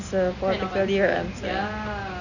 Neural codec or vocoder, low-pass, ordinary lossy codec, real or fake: none; 7.2 kHz; none; real